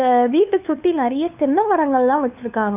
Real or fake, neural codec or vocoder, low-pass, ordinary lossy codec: fake; codec, 16 kHz, 2 kbps, FunCodec, trained on LibriTTS, 25 frames a second; 3.6 kHz; none